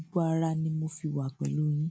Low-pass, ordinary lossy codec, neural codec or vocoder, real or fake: none; none; none; real